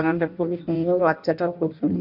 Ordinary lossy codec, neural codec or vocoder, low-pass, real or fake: none; codec, 16 kHz in and 24 kHz out, 0.6 kbps, FireRedTTS-2 codec; 5.4 kHz; fake